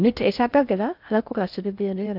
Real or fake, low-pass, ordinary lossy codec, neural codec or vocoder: fake; 5.4 kHz; none; codec, 16 kHz in and 24 kHz out, 0.6 kbps, FocalCodec, streaming, 4096 codes